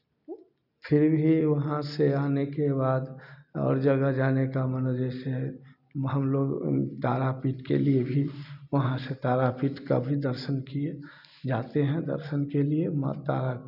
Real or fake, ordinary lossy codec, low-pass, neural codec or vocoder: real; none; 5.4 kHz; none